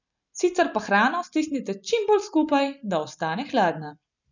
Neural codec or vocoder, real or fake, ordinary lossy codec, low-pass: none; real; none; 7.2 kHz